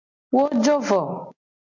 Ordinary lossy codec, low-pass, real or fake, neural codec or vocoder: MP3, 48 kbps; 7.2 kHz; real; none